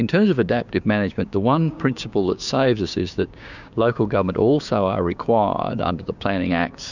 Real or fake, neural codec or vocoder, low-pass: fake; codec, 16 kHz, 6 kbps, DAC; 7.2 kHz